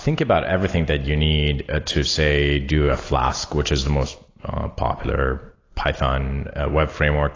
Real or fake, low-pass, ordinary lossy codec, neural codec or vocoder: real; 7.2 kHz; AAC, 32 kbps; none